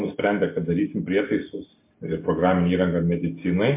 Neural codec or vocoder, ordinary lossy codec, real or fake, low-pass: none; MP3, 24 kbps; real; 3.6 kHz